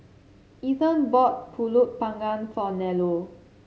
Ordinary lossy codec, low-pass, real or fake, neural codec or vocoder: none; none; real; none